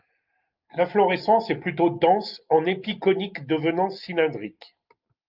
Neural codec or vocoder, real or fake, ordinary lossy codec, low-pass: none; real; Opus, 32 kbps; 5.4 kHz